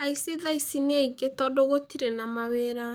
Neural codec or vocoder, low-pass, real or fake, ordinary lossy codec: codec, 44.1 kHz, 7.8 kbps, DAC; none; fake; none